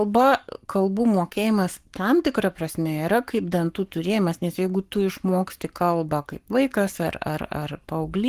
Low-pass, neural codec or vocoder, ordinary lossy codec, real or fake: 14.4 kHz; codec, 44.1 kHz, 7.8 kbps, Pupu-Codec; Opus, 24 kbps; fake